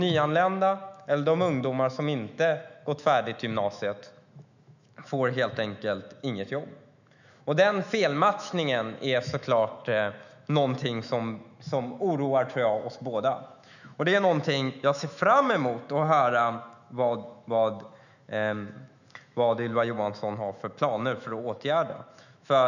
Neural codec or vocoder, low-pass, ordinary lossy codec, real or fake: autoencoder, 48 kHz, 128 numbers a frame, DAC-VAE, trained on Japanese speech; 7.2 kHz; none; fake